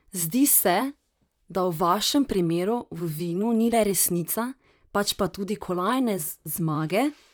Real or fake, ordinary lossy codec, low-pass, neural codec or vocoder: fake; none; none; vocoder, 44.1 kHz, 128 mel bands, Pupu-Vocoder